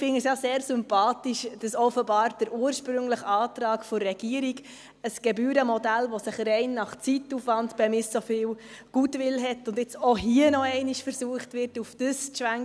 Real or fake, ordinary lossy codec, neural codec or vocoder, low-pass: real; none; none; none